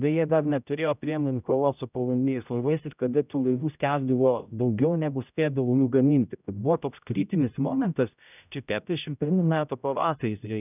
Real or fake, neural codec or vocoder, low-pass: fake; codec, 16 kHz, 0.5 kbps, X-Codec, HuBERT features, trained on general audio; 3.6 kHz